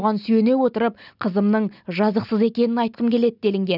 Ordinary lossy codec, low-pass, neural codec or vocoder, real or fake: none; 5.4 kHz; none; real